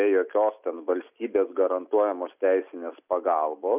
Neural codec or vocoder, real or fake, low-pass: none; real; 3.6 kHz